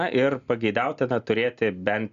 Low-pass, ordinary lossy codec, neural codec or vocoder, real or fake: 7.2 kHz; Opus, 64 kbps; none; real